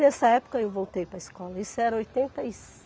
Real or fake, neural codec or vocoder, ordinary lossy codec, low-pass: real; none; none; none